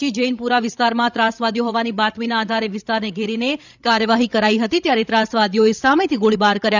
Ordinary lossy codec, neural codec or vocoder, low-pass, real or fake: none; codec, 16 kHz, 16 kbps, FreqCodec, larger model; 7.2 kHz; fake